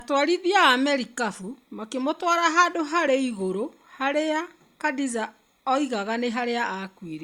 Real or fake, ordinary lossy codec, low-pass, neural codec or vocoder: real; Opus, 64 kbps; 19.8 kHz; none